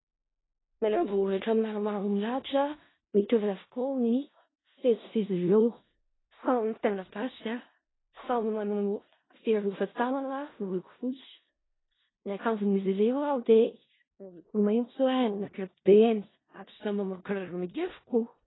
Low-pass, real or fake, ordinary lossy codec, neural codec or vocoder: 7.2 kHz; fake; AAC, 16 kbps; codec, 16 kHz in and 24 kHz out, 0.4 kbps, LongCat-Audio-Codec, four codebook decoder